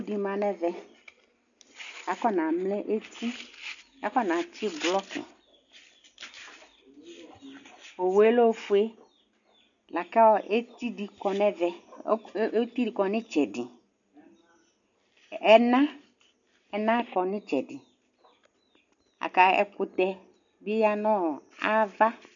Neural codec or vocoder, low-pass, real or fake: none; 7.2 kHz; real